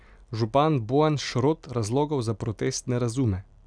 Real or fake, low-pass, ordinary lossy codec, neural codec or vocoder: real; 9.9 kHz; none; none